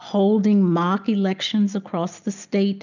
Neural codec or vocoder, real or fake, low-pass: none; real; 7.2 kHz